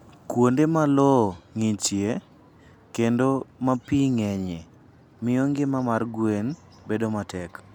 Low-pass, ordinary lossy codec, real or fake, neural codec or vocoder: 19.8 kHz; none; real; none